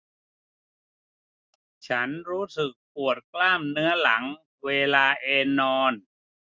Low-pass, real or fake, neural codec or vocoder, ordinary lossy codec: none; real; none; none